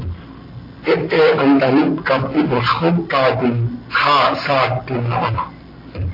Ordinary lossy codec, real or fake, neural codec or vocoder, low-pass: AAC, 24 kbps; real; none; 5.4 kHz